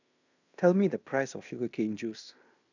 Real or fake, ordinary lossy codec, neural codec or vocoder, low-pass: fake; none; codec, 16 kHz in and 24 kHz out, 0.9 kbps, LongCat-Audio-Codec, fine tuned four codebook decoder; 7.2 kHz